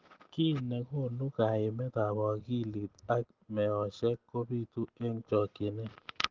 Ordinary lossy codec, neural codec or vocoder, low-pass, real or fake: Opus, 16 kbps; none; 7.2 kHz; real